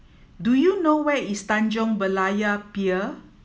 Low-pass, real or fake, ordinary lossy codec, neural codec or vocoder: none; real; none; none